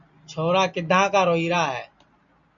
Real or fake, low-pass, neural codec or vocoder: real; 7.2 kHz; none